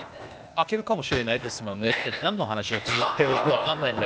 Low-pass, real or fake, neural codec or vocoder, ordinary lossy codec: none; fake; codec, 16 kHz, 0.8 kbps, ZipCodec; none